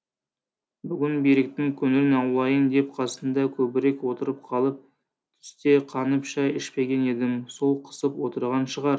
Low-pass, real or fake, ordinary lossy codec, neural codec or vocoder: none; real; none; none